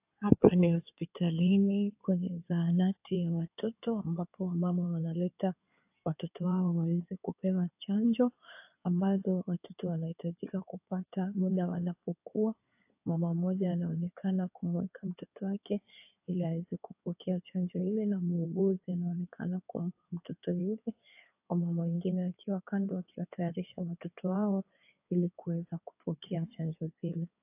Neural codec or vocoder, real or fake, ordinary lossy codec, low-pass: codec, 16 kHz in and 24 kHz out, 2.2 kbps, FireRedTTS-2 codec; fake; AAC, 32 kbps; 3.6 kHz